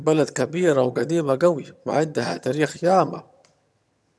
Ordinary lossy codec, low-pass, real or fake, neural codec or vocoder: none; none; fake; vocoder, 22.05 kHz, 80 mel bands, HiFi-GAN